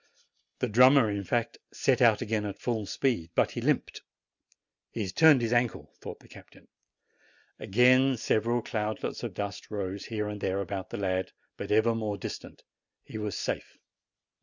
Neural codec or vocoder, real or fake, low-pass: none; real; 7.2 kHz